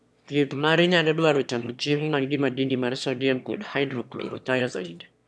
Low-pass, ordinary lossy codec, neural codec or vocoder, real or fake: none; none; autoencoder, 22.05 kHz, a latent of 192 numbers a frame, VITS, trained on one speaker; fake